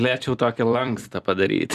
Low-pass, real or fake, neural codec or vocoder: 14.4 kHz; fake; vocoder, 44.1 kHz, 128 mel bands every 256 samples, BigVGAN v2